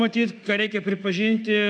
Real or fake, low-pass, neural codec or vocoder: fake; 9.9 kHz; autoencoder, 48 kHz, 32 numbers a frame, DAC-VAE, trained on Japanese speech